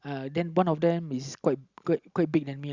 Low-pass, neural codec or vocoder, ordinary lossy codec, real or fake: 7.2 kHz; none; Opus, 64 kbps; real